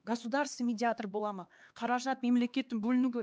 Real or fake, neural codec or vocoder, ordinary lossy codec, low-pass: fake; codec, 16 kHz, 2 kbps, X-Codec, HuBERT features, trained on LibriSpeech; none; none